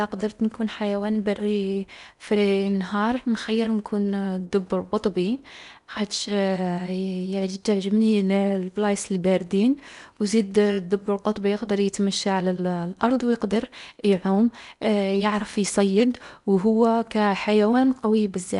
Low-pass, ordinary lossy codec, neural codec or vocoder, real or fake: 10.8 kHz; none; codec, 16 kHz in and 24 kHz out, 0.8 kbps, FocalCodec, streaming, 65536 codes; fake